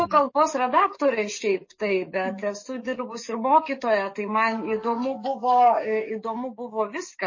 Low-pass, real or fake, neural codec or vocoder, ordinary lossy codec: 7.2 kHz; fake; codec, 44.1 kHz, 7.8 kbps, DAC; MP3, 32 kbps